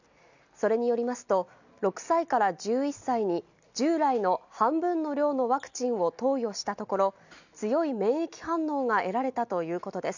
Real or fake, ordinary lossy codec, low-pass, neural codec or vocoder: real; none; 7.2 kHz; none